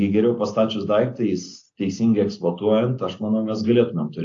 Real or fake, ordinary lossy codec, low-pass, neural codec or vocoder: real; AAC, 48 kbps; 7.2 kHz; none